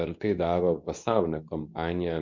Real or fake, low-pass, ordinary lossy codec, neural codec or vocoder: fake; 7.2 kHz; MP3, 48 kbps; codec, 24 kHz, 0.9 kbps, WavTokenizer, medium speech release version 2